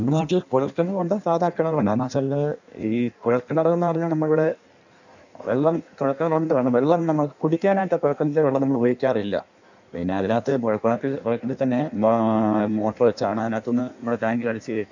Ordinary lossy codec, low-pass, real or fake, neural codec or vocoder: none; 7.2 kHz; fake; codec, 16 kHz in and 24 kHz out, 1.1 kbps, FireRedTTS-2 codec